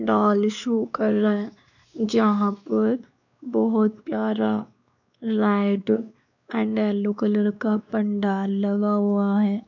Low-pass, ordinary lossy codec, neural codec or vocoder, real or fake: 7.2 kHz; none; codec, 16 kHz, 2 kbps, X-Codec, WavLM features, trained on Multilingual LibriSpeech; fake